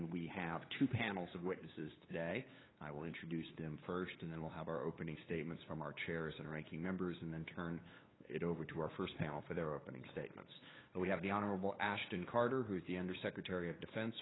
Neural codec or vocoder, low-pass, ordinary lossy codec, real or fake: codec, 16 kHz, 8 kbps, FunCodec, trained on LibriTTS, 25 frames a second; 7.2 kHz; AAC, 16 kbps; fake